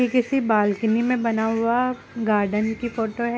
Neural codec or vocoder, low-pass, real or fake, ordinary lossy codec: none; none; real; none